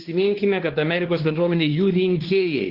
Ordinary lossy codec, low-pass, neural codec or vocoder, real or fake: Opus, 16 kbps; 5.4 kHz; codec, 16 kHz, 2 kbps, X-Codec, WavLM features, trained on Multilingual LibriSpeech; fake